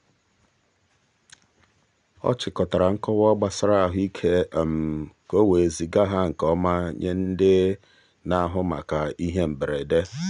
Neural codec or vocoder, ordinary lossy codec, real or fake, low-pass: none; none; real; 9.9 kHz